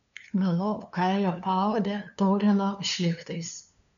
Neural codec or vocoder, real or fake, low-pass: codec, 16 kHz, 2 kbps, FunCodec, trained on LibriTTS, 25 frames a second; fake; 7.2 kHz